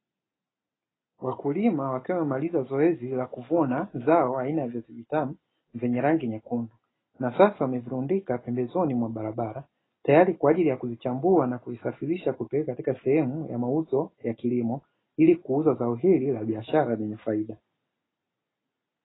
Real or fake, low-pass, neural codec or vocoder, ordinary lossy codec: real; 7.2 kHz; none; AAC, 16 kbps